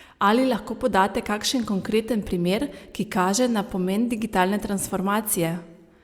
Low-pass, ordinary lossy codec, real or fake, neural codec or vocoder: 19.8 kHz; Opus, 64 kbps; real; none